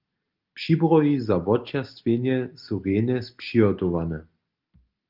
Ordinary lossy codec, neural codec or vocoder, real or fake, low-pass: Opus, 32 kbps; none; real; 5.4 kHz